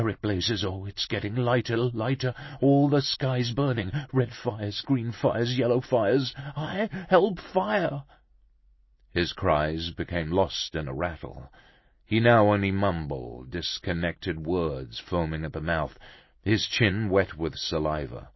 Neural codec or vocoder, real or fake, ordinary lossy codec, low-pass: none; real; MP3, 24 kbps; 7.2 kHz